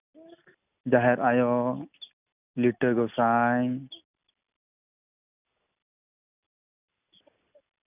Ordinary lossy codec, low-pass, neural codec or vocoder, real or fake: none; 3.6 kHz; none; real